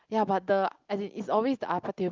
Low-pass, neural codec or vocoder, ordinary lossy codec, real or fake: 7.2 kHz; none; Opus, 32 kbps; real